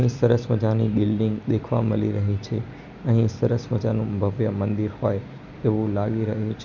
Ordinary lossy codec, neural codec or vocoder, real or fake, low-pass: none; vocoder, 44.1 kHz, 128 mel bands every 256 samples, BigVGAN v2; fake; 7.2 kHz